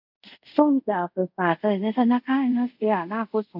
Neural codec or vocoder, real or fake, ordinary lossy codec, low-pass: codec, 24 kHz, 0.5 kbps, DualCodec; fake; none; 5.4 kHz